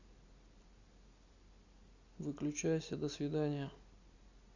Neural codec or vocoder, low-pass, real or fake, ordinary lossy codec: none; 7.2 kHz; real; Opus, 64 kbps